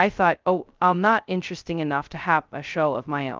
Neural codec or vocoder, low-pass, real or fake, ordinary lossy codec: codec, 16 kHz, 0.2 kbps, FocalCodec; 7.2 kHz; fake; Opus, 32 kbps